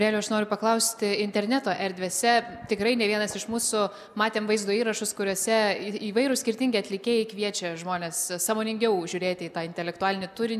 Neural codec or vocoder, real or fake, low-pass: none; real; 14.4 kHz